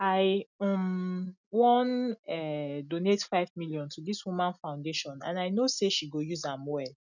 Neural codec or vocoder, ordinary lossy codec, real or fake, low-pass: none; none; real; 7.2 kHz